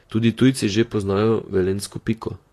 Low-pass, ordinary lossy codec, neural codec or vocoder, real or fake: 14.4 kHz; AAC, 48 kbps; vocoder, 44.1 kHz, 128 mel bands, Pupu-Vocoder; fake